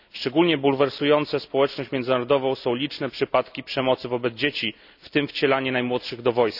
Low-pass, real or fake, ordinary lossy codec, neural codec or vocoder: 5.4 kHz; real; none; none